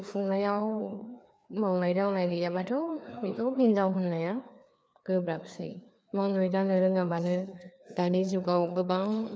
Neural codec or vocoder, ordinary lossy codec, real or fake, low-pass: codec, 16 kHz, 2 kbps, FreqCodec, larger model; none; fake; none